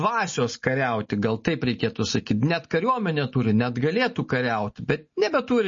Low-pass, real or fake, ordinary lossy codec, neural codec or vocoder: 7.2 kHz; real; MP3, 32 kbps; none